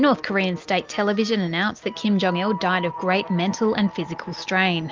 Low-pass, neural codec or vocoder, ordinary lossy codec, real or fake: 7.2 kHz; none; Opus, 24 kbps; real